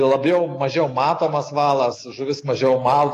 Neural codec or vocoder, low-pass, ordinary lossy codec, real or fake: autoencoder, 48 kHz, 128 numbers a frame, DAC-VAE, trained on Japanese speech; 14.4 kHz; AAC, 48 kbps; fake